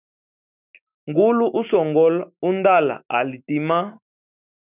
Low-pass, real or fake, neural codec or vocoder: 3.6 kHz; fake; autoencoder, 48 kHz, 128 numbers a frame, DAC-VAE, trained on Japanese speech